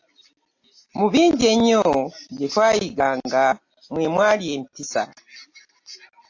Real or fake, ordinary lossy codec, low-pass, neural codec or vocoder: real; AAC, 48 kbps; 7.2 kHz; none